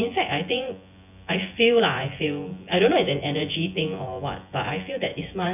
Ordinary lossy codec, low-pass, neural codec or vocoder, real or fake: none; 3.6 kHz; vocoder, 24 kHz, 100 mel bands, Vocos; fake